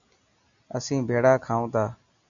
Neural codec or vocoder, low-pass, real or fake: none; 7.2 kHz; real